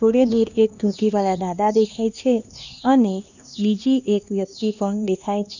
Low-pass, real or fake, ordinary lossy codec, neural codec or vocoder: 7.2 kHz; fake; none; codec, 16 kHz, 2 kbps, X-Codec, HuBERT features, trained on LibriSpeech